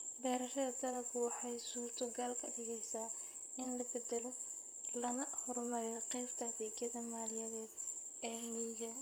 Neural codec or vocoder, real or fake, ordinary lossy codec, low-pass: vocoder, 44.1 kHz, 128 mel bands, Pupu-Vocoder; fake; none; none